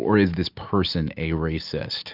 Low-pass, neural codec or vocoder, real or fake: 5.4 kHz; none; real